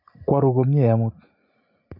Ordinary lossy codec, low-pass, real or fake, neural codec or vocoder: MP3, 48 kbps; 5.4 kHz; real; none